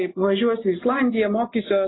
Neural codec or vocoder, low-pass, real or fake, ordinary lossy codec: none; 7.2 kHz; real; AAC, 16 kbps